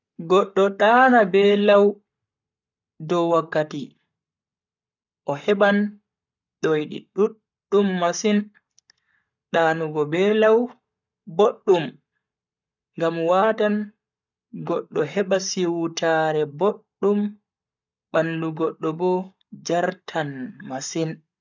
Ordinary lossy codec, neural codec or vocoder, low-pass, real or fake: none; codec, 44.1 kHz, 7.8 kbps, Pupu-Codec; 7.2 kHz; fake